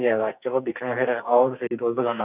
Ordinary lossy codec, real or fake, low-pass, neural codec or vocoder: none; fake; 3.6 kHz; codec, 44.1 kHz, 2.6 kbps, SNAC